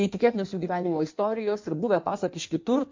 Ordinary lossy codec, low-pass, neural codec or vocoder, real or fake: MP3, 48 kbps; 7.2 kHz; codec, 16 kHz in and 24 kHz out, 1.1 kbps, FireRedTTS-2 codec; fake